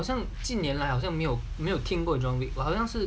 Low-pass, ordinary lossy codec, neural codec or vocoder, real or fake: none; none; none; real